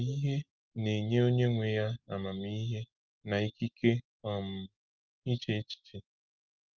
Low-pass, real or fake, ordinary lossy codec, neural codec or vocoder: 7.2 kHz; real; Opus, 32 kbps; none